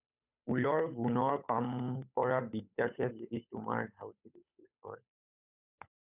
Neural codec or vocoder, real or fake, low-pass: codec, 16 kHz, 8 kbps, FunCodec, trained on Chinese and English, 25 frames a second; fake; 3.6 kHz